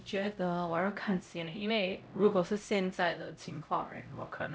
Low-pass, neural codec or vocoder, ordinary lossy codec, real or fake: none; codec, 16 kHz, 0.5 kbps, X-Codec, HuBERT features, trained on LibriSpeech; none; fake